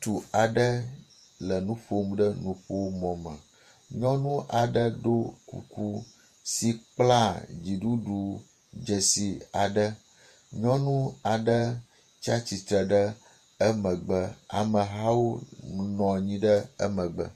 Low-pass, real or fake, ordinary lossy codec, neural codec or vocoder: 14.4 kHz; fake; MP3, 64 kbps; vocoder, 48 kHz, 128 mel bands, Vocos